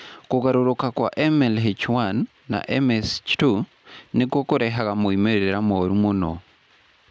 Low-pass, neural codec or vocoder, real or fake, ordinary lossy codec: none; none; real; none